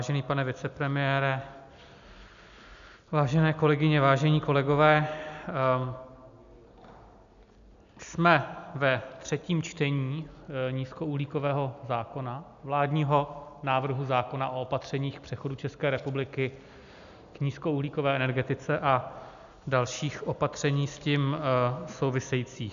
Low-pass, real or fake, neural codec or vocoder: 7.2 kHz; real; none